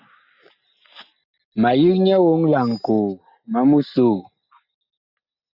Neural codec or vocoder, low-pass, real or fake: none; 5.4 kHz; real